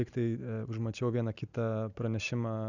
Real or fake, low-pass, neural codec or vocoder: real; 7.2 kHz; none